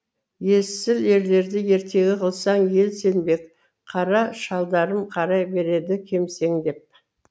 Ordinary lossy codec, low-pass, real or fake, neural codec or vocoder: none; none; real; none